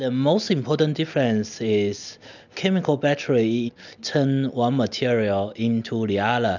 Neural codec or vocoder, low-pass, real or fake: none; 7.2 kHz; real